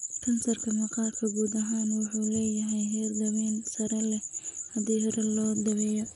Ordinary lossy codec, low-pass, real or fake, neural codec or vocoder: none; 10.8 kHz; real; none